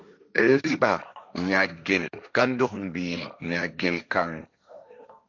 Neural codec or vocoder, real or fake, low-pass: codec, 16 kHz, 1.1 kbps, Voila-Tokenizer; fake; 7.2 kHz